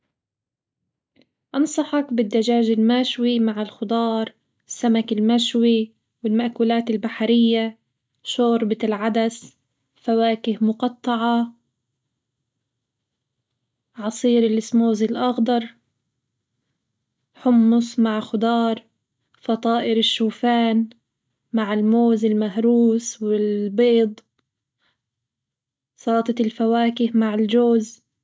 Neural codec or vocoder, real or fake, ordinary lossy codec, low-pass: none; real; none; none